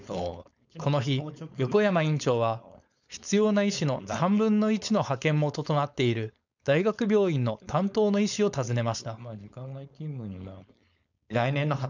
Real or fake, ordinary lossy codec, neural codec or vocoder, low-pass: fake; none; codec, 16 kHz, 4.8 kbps, FACodec; 7.2 kHz